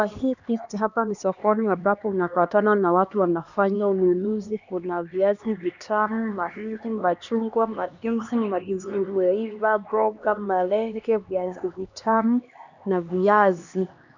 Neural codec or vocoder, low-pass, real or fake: codec, 16 kHz, 2 kbps, X-Codec, HuBERT features, trained on LibriSpeech; 7.2 kHz; fake